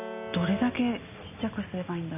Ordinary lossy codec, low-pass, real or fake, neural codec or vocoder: none; 3.6 kHz; real; none